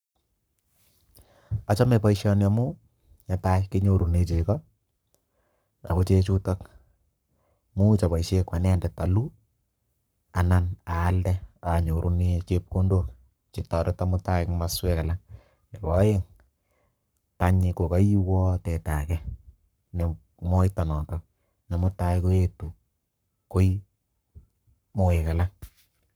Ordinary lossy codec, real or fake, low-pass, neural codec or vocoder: none; fake; none; codec, 44.1 kHz, 7.8 kbps, Pupu-Codec